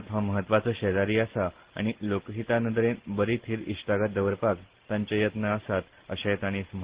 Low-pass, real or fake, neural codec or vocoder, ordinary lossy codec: 3.6 kHz; real; none; Opus, 16 kbps